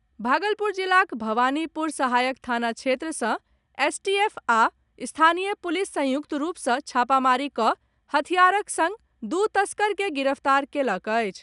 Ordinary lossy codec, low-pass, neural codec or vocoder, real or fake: none; 10.8 kHz; none; real